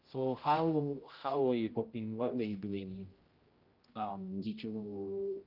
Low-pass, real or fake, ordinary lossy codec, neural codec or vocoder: 5.4 kHz; fake; Opus, 32 kbps; codec, 16 kHz, 0.5 kbps, X-Codec, HuBERT features, trained on general audio